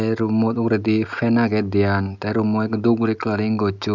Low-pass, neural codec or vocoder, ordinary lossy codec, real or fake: 7.2 kHz; none; none; real